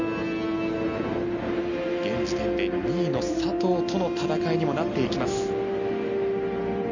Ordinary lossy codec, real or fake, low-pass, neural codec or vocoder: none; real; 7.2 kHz; none